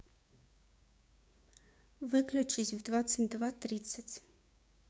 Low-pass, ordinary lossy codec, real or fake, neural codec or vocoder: none; none; fake; codec, 16 kHz, 6 kbps, DAC